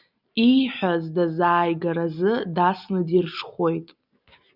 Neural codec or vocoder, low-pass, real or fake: none; 5.4 kHz; real